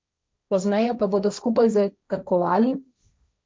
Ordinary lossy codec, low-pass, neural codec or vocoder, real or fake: none; none; codec, 16 kHz, 1.1 kbps, Voila-Tokenizer; fake